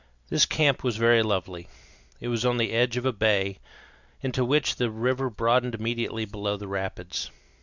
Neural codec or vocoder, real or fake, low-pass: none; real; 7.2 kHz